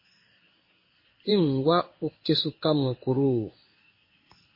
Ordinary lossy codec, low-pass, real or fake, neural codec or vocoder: MP3, 24 kbps; 5.4 kHz; fake; codec, 16 kHz in and 24 kHz out, 1 kbps, XY-Tokenizer